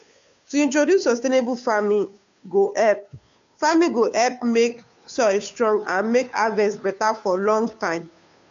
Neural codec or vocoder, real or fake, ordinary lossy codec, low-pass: codec, 16 kHz, 2 kbps, FunCodec, trained on Chinese and English, 25 frames a second; fake; AAC, 64 kbps; 7.2 kHz